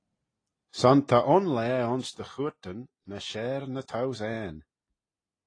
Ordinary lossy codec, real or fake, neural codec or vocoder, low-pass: AAC, 32 kbps; real; none; 9.9 kHz